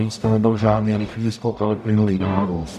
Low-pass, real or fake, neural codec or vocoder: 14.4 kHz; fake; codec, 44.1 kHz, 0.9 kbps, DAC